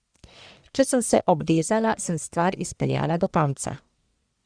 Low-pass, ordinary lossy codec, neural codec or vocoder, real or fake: 9.9 kHz; Opus, 64 kbps; codec, 44.1 kHz, 1.7 kbps, Pupu-Codec; fake